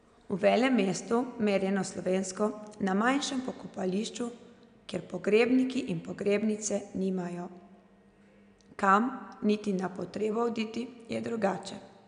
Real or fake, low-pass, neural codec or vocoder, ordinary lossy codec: real; 9.9 kHz; none; none